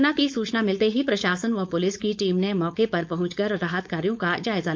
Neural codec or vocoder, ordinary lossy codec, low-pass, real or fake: codec, 16 kHz, 4.8 kbps, FACodec; none; none; fake